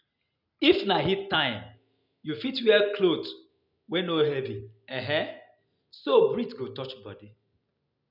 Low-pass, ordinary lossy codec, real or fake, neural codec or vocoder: 5.4 kHz; none; real; none